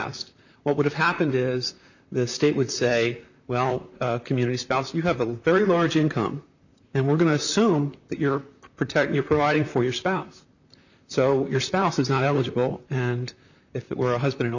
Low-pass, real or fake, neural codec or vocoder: 7.2 kHz; fake; vocoder, 44.1 kHz, 128 mel bands, Pupu-Vocoder